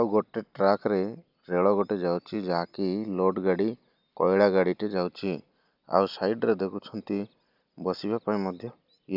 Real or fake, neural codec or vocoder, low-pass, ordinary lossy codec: real; none; 5.4 kHz; none